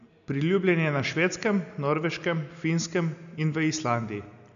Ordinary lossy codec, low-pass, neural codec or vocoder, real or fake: AAC, 96 kbps; 7.2 kHz; none; real